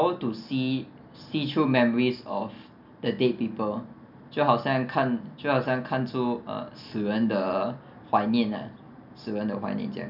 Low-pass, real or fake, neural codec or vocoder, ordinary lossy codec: 5.4 kHz; real; none; none